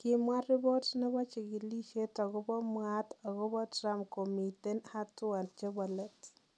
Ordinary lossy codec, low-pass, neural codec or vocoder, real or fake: none; none; none; real